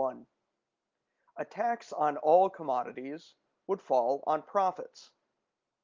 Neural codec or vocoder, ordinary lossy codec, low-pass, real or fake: none; Opus, 24 kbps; 7.2 kHz; real